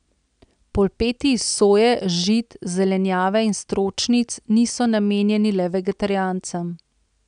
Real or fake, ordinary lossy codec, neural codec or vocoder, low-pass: real; none; none; 9.9 kHz